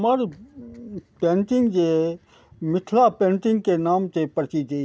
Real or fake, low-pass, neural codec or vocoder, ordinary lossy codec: real; none; none; none